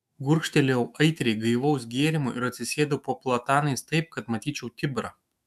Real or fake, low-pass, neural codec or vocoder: fake; 14.4 kHz; autoencoder, 48 kHz, 128 numbers a frame, DAC-VAE, trained on Japanese speech